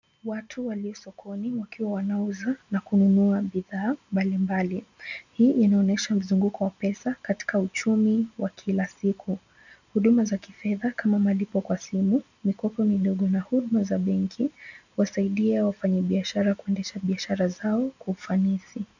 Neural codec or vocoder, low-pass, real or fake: none; 7.2 kHz; real